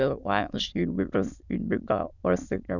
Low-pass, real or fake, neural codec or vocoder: 7.2 kHz; fake; autoencoder, 22.05 kHz, a latent of 192 numbers a frame, VITS, trained on many speakers